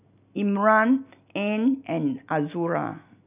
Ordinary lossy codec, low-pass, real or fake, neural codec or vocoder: none; 3.6 kHz; real; none